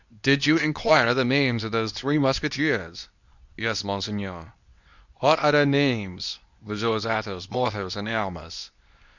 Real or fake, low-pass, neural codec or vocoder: fake; 7.2 kHz; codec, 24 kHz, 0.9 kbps, WavTokenizer, medium speech release version 2